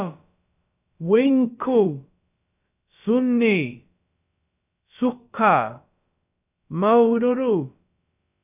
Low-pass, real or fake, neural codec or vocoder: 3.6 kHz; fake; codec, 16 kHz, about 1 kbps, DyCAST, with the encoder's durations